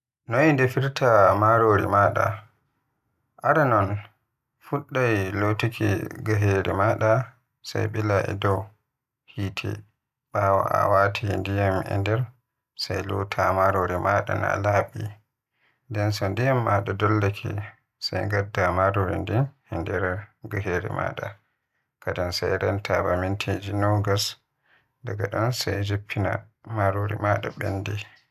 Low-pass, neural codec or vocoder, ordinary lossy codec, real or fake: 14.4 kHz; none; none; real